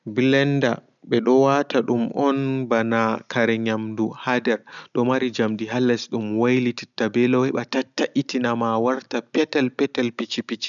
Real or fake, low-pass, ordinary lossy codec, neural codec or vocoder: real; 7.2 kHz; none; none